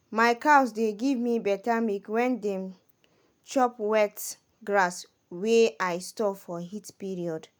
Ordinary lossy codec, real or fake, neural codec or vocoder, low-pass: none; real; none; none